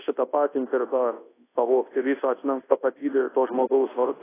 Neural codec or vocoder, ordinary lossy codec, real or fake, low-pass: codec, 24 kHz, 0.9 kbps, WavTokenizer, large speech release; AAC, 16 kbps; fake; 3.6 kHz